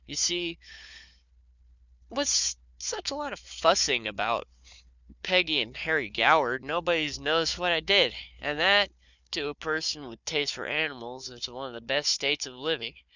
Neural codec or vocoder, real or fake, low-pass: codec, 16 kHz, 4 kbps, FunCodec, trained on Chinese and English, 50 frames a second; fake; 7.2 kHz